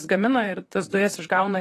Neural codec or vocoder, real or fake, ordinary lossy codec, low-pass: vocoder, 44.1 kHz, 128 mel bands, Pupu-Vocoder; fake; AAC, 48 kbps; 14.4 kHz